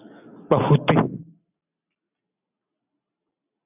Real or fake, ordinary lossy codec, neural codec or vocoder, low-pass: real; AAC, 24 kbps; none; 3.6 kHz